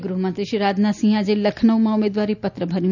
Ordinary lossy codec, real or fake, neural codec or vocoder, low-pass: none; real; none; 7.2 kHz